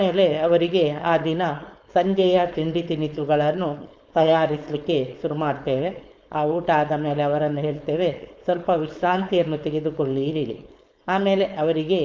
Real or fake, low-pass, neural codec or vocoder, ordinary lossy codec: fake; none; codec, 16 kHz, 4.8 kbps, FACodec; none